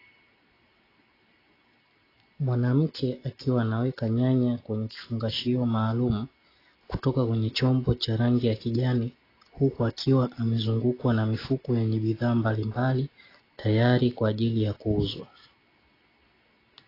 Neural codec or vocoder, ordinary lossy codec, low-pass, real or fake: none; AAC, 24 kbps; 5.4 kHz; real